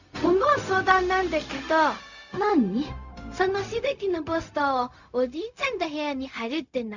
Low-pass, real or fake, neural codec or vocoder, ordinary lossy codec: 7.2 kHz; fake; codec, 16 kHz, 0.4 kbps, LongCat-Audio-Codec; none